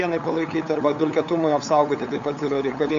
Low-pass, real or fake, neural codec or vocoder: 7.2 kHz; fake; codec, 16 kHz, 8 kbps, FunCodec, trained on LibriTTS, 25 frames a second